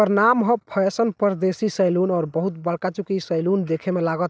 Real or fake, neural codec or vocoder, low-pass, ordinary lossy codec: real; none; none; none